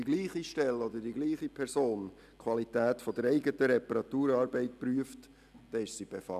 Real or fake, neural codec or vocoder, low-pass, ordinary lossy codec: real; none; 14.4 kHz; none